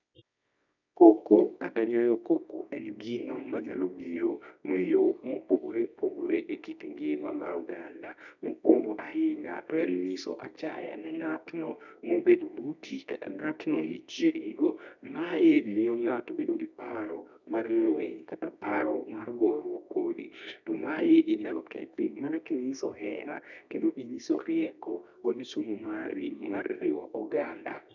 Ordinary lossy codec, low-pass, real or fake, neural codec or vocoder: none; 7.2 kHz; fake; codec, 24 kHz, 0.9 kbps, WavTokenizer, medium music audio release